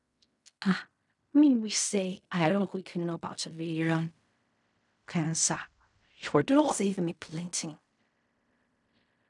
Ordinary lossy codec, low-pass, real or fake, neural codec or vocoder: none; 10.8 kHz; fake; codec, 16 kHz in and 24 kHz out, 0.4 kbps, LongCat-Audio-Codec, fine tuned four codebook decoder